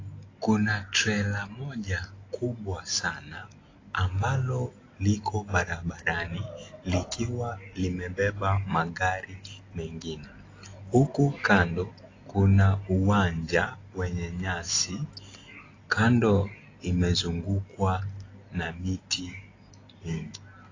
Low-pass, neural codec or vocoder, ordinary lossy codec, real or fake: 7.2 kHz; none; AAC, 32 kbps; real